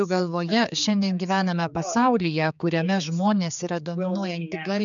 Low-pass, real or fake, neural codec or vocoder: 7.2 kHz; fake; codec, 16 kHz, 4 kbps, X-Codec, HuBERT features, trained on general audio